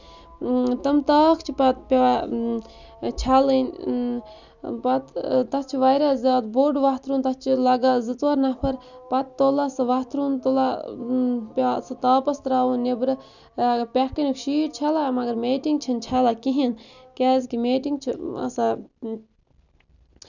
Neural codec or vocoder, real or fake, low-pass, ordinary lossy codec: none; real; 7.2 kHz; none